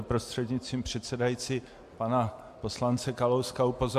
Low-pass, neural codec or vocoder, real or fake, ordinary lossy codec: 14.4 kHz; none; real; MP3, 64 kbps